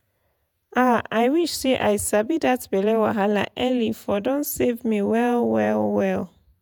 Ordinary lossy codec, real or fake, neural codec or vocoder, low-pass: none; fake; vocoder, 48 kHz, 128 mel bands, Vocos; none